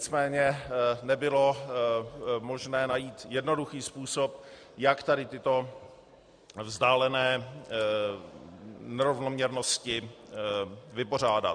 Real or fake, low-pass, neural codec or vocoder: real; 9.9 kHz; none